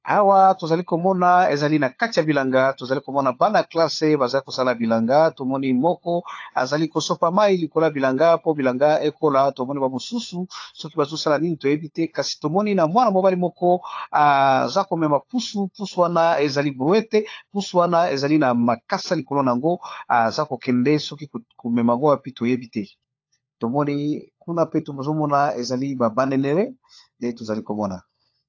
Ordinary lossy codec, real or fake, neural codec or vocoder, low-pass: AAC, 48 kbps; fake; codec, 16 kHz, 4 kbps, FunCodec, trained on LibriTTS, 50 frames a second; 7.2 kHz